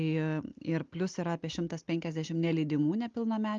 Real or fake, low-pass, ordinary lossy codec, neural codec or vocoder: real; 7.2 kHz; Opus, 32 kbps; none